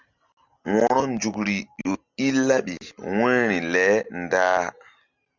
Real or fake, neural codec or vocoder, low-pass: real; none; 7.2 kHz